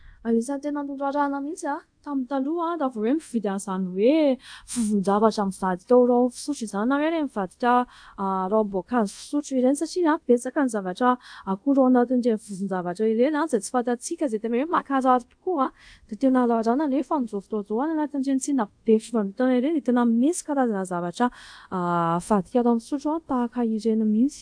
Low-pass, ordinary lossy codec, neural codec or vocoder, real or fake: 9.9 kHz; AAC, 64 kbps; codec, 24 kHz, 0.5 kbps, DualCodec; fake